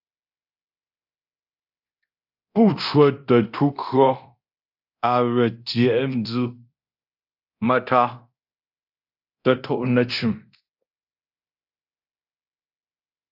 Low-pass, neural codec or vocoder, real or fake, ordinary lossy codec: 5.4 kHz; codec, 24 kHz, 0.9 kbps, DualCodec; fake; AAC, 48 kbps